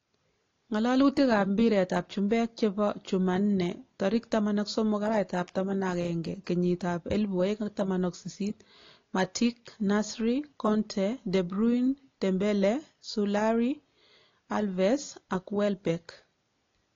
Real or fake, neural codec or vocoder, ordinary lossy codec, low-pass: real; none; AAC, 32 kbps; 7.2 kHz